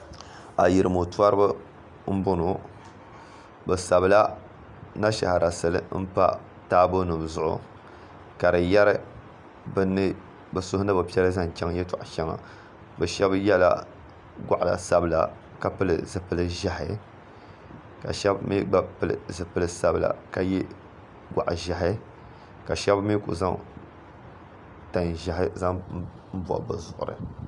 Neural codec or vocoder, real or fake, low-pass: none; real; 10.8 kHz